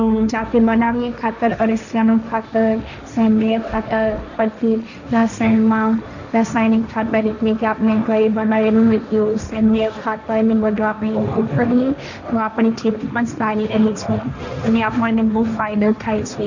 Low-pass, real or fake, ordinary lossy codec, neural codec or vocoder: 7.2 kHz; fake; none; codec, 16 kHz, 1.1 kbps, Voila-Tokenizer